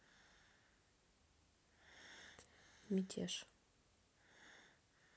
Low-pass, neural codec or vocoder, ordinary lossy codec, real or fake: none; none; none; real